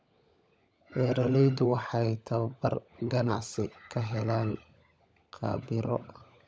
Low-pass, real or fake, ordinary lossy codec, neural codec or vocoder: none; fake; none; codec, 16 kHz, 16 kbps, FunCodec, trained on LibriTTS, 50 frames a second